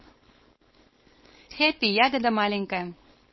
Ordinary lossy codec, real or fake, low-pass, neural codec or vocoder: MP3, 24 kbps; fake; 7.2 kHz; codec, 16 kHz, 4.8 kbps, FACodec